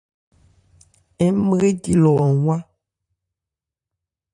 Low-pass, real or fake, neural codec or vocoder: 10.8 kHz; fake; vocoder, 44.1 kHz, 128 mel bands, Pupu-Vocoder